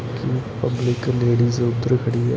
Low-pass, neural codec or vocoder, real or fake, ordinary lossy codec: none; none; real; none